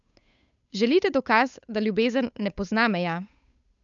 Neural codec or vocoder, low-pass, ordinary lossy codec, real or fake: codec, 16 kHz, 8 kbps, FunCodec, trained on Chinese and English, 25 frames a second; 7.2 kHz; none; fake